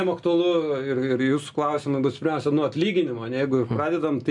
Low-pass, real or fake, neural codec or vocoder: 10.8 kHz; real; none